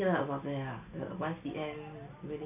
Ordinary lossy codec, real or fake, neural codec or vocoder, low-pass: none; real; none; 3.6 kHz